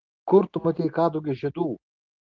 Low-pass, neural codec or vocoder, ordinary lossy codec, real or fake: 7.2 kHz; none; Opus, 16 kbps; real